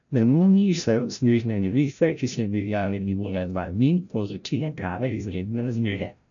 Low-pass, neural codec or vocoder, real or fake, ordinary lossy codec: 7.2 kHz; codec, 16 kHz, 0.5 kbps, FreqCodec, larger model; fake; none